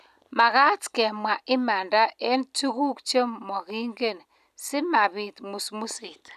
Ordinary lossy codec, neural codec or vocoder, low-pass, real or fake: none; none; 14.4 kHz; real